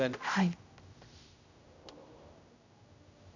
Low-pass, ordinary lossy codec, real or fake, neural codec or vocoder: 7.2 kHz; none; fake; codec, 16 kHz, 0.5 kbps, X-Codec, HuBERT features, trained on general audio